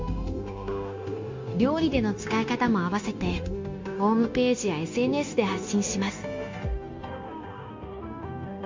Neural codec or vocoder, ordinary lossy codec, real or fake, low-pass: codec, 16 kHz, 0.9 kbps, LongCat-Audio-Codec; MP3, 48 kbps; fake; 7.2 kHz